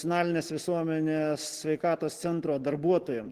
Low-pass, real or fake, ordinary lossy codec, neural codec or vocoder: 14.4 kHz; real; Opus, 16 kbps; none